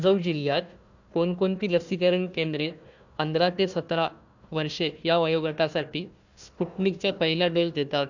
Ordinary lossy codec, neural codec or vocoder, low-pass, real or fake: none; codec, 16 kHz, 1 kbps, FunCodec, trained on Chinese and English, 50 frames a second; 7.2 kHz; fake